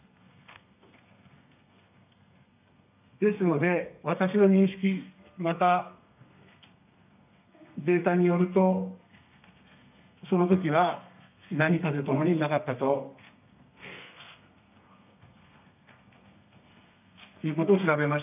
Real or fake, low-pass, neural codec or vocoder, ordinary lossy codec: fake; 3.6 kHz; codec, 32 kHz, 1.9 kbps, SNAC; none